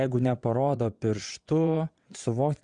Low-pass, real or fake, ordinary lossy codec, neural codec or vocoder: 9.9 kHz; fake; Opus, 64 kbps; vocoder, 22.05 kHz, 80 mel bands, WaveNeXt